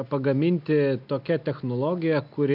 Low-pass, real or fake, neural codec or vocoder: 5.4 kHz; real; none